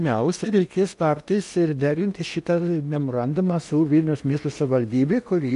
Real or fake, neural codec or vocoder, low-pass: fake; codec, 16 kHz in and 24 kHz out, 0.8 kbps, FocalCodec, streaming, 65536 codes; 10.8 kHz